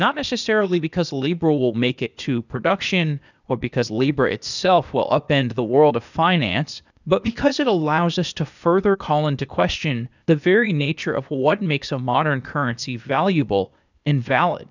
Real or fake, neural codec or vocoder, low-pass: fake; codec, 16 kHz, 0.8 kbps, ZipCodec; 7.2 kHz